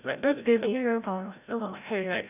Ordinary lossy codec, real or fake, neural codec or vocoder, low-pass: none; fake; codec, 16 kHz, 0.5 kbps, FreqCodec, larger model; 3.6 kHz